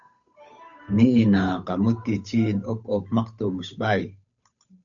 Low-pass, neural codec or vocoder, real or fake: 7.2 kHz; codec, 16 kHz, 8 kbps, FunCodec, trained on Chinese and English, 25 frames a second; fake